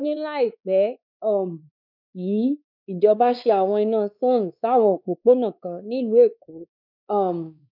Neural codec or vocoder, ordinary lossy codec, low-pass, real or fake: codec, 16 kHz, 2 kbps, X-Codec, WavLM features, trained on Multilingual LibriSpeech; none; 5.4 kHz; fake